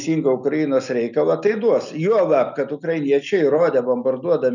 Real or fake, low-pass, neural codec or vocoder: real; 7.2 kHz; none